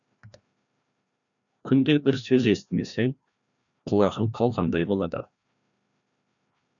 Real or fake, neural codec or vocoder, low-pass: fake; codec, 16 kHz, 1 kbps, FreqCodec, larger model; 7.2 kHz